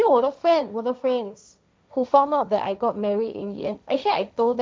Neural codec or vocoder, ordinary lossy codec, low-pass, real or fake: codec, 16 kHz, 1.1 kbps, Voila-Tokenizer; none; none; fake